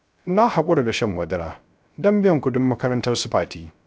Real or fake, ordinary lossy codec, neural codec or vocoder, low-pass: fake; none; codec, 16 kHz, 0.3 kbps, FocalCodec; none